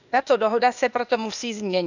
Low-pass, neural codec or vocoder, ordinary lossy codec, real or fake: 7.2 kHz; codec, 16 kHz, 0.8 kbps, ZipCodec; none; fake